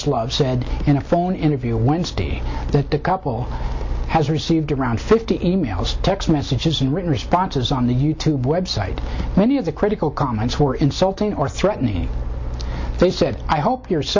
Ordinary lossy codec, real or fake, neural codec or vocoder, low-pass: MP3, 64 kbps; real; none; 7.2 kHz